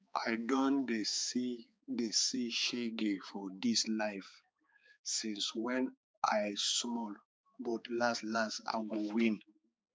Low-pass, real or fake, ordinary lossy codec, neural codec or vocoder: none; fake; none; codec, 16 kHz, 4 kbps, X-Codec, HuBERT features, trained on balanced general audio